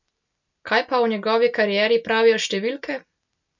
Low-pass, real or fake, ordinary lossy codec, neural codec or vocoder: 7.2 kHz; real; none; none